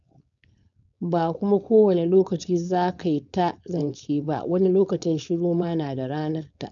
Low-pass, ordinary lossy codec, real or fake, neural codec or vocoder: 7.2 kHz; none; fake; codec, 16 kHz, 4.8 kbps, FACodec